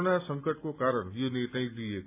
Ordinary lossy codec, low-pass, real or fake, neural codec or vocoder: MP3, 32 kbps; 3.6 kHz; real; none